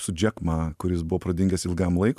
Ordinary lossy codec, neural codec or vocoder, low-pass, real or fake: MP3, 96 kbps; none; 14.4 kHz; real